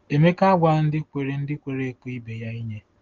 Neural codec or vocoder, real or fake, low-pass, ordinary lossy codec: none; real; 7.2 kHz; Opus, 16 kbps